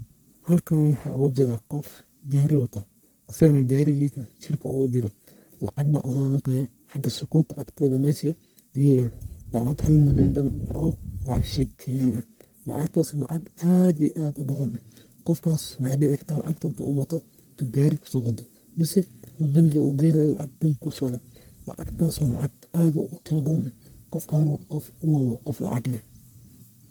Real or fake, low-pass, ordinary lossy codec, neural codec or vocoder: fake; none; none; codec, 44.1 kHz, 1.7 kbps, Pupu-Codec